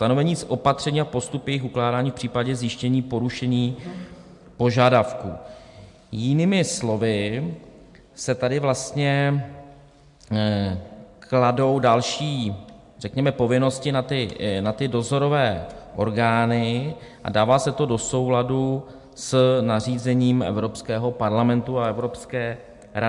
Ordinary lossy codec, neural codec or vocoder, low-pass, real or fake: MP3, 64 kbps; none; 10.8 kHz; real